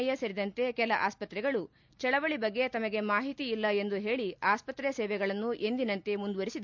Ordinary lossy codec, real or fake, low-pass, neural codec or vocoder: MP3, 64 kbps; real; 7.2 kHz; none